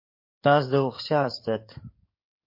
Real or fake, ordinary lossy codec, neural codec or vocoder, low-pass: fake; MP3, 32 kbps; codec, 16 kHz in and 24 kHz out, 2.2 kbps, FireRedTTS-2 codec; 5.4 kHz